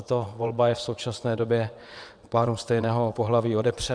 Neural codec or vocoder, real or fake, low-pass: vocoder, 22.05 kHz, 80 mel bands, WaveNeXt; fake; 9.9 kHz